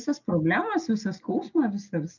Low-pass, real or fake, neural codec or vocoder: 7.2 kHz; real; none